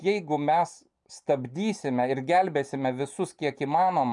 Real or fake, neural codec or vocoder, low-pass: real; none; 10.8 kHz